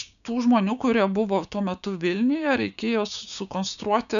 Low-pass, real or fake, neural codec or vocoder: 7.2 kHz; fake; codec, 16 kHz, 6 kbps, DAC